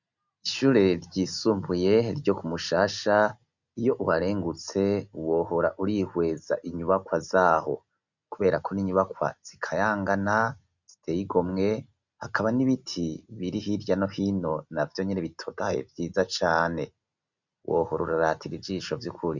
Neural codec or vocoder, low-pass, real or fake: none; 7.2 kHz; real